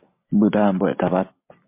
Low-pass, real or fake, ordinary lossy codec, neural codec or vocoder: 3.6 kHz; real; MP3, 24 kbps; none